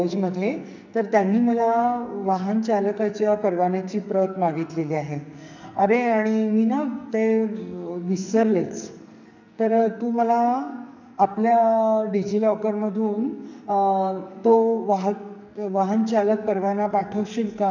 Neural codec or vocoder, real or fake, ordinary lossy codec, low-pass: codec, 44.1 kHz, 2.6 kbps, SNAC; fake; none; 7.2 kHz